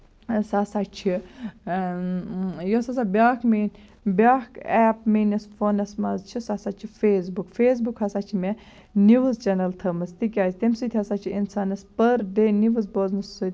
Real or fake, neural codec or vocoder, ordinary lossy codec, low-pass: real; none; none; none